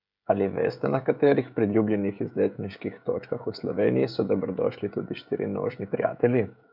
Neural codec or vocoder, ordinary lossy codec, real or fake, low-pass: codec, 16 kHz, 16 kbps, FreqCodec, smaller model; none; fake; 5.4 kHz